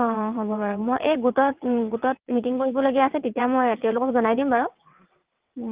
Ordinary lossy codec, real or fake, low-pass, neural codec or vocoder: Opus, 32 kbps; fake; 3.6 kHz; vocoder, 22.05 kHz, 80 mel bands, WaveNeXt